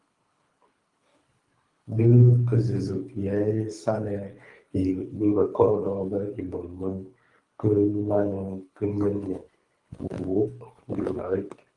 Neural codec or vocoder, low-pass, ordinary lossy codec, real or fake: codec, 24 kHz, 3 kbps, HILCodec; 10.8 kHz; Opus, 32 kbps; fake